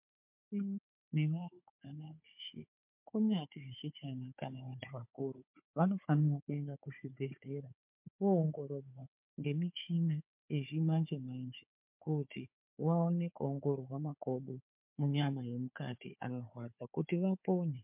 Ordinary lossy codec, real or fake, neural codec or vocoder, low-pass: MP3, 32 kbps; fake; codec, 16 kHz, 4 kbps, FunCodec, trained on Chinese and English, 50 frames a second; 3.6 kHz